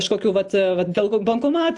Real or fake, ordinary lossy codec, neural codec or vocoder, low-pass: real; AAC, 64 kbps; none; 10.8 kHz